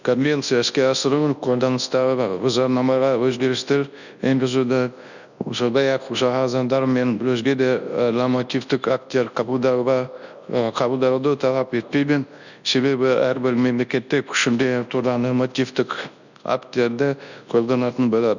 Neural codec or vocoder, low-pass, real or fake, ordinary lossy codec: codec, 24 kHz, 0.9 kbps, WavTokenizer, large speech release; 7.2 kHz; fake; none